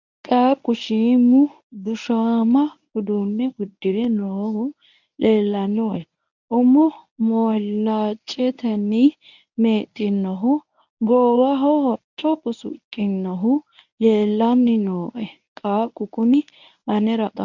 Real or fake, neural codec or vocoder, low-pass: fake; codec, 24 kHz, 0.9 kbps, WavTokenizer, medium speech release version 1; 7.2 kHz